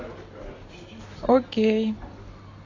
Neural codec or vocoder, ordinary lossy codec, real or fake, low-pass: none; AAC, 32 kbps; real; 7.2 kHz